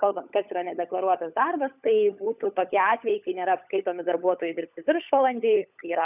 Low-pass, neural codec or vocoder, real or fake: 3.6 kHz; codec, 16 kHz, 16 kbps, FunCodec, trained on LibriTTS, 50 frames a second; fake